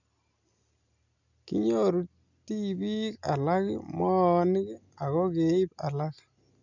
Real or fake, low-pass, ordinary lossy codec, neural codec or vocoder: real; 7.2 kHz; none; none